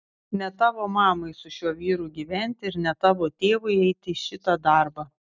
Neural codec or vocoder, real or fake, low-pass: none; real; 7.2 kHz